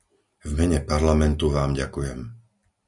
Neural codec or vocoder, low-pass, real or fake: none; 10.8 kHz; real